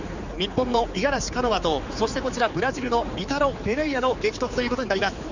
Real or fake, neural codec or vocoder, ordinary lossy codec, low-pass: fake; codec, 16 kHz, 4 kbps, X-Codec, HuBERT features, trained on general audio; Opus, 64 kbps; 7.2 kHz